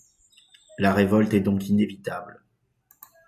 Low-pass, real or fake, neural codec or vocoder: 14.4 kHz; real; none